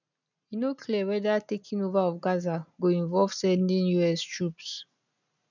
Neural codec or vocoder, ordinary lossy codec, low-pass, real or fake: none; none; 7.2 kHz; real